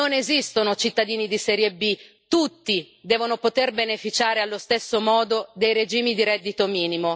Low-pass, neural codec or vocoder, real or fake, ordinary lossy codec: none; none; real; none